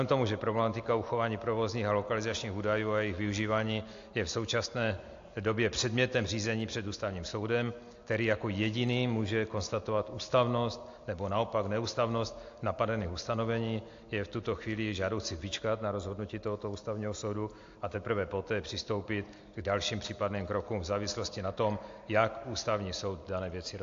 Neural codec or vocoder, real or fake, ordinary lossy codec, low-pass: none; real; AAC, 48 kbps; 7.2 kHz